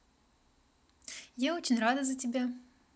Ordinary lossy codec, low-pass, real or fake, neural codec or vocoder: none; none; real; none